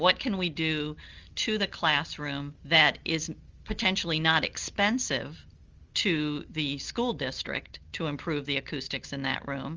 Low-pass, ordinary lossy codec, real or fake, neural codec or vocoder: 7.2 kHz; Opus, 32 kbps; real; none